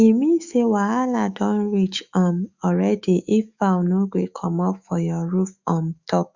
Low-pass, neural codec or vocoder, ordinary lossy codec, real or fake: 7.2 kHz; autoencoder, 48 kHz, 128 numbers a frame, DAC-VAE, trained on Japanese speech; Opus, 64 kbps; fake